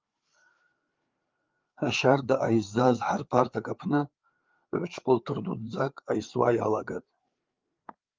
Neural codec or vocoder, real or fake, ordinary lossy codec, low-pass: codec, 16 kHz, 4 kbps, FreqCodec, larger model; fake; Opus, 32 kbps; 7.2 kHz